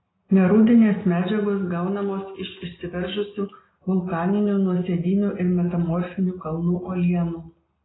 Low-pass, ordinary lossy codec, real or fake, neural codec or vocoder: 7.2 kHz; AAC, 16 kbps; real; none